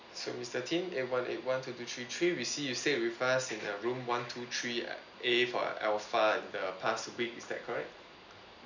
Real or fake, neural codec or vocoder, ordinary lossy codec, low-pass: real; none; none; 7.2 kHz